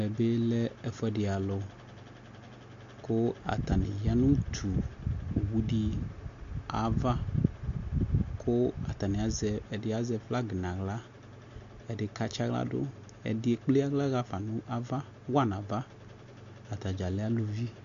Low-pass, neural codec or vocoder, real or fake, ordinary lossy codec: 7.2 kHz; none; real; MP3, 48 kbps